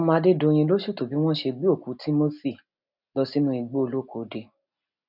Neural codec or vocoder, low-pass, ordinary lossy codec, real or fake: none; 5.4 kHz; none; real